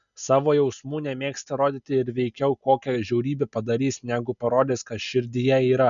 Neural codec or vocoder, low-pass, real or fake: none; 7.2 kHz; real